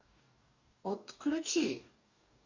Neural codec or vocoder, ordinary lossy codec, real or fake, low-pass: codec, 44.1 kHz, 2.6 kbps, DAC; Opus, 64 kbps; fake; 7.2 kHz